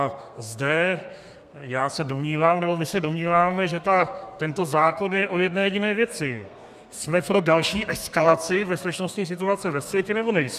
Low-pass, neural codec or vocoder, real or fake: 14.4 kHz; codec, 32 kHz, 1.9 kbps, SNAC; fake